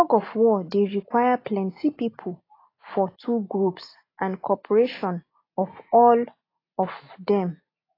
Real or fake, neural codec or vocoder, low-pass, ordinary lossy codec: real; none; 5.4 kHz; AAC, 24 kbps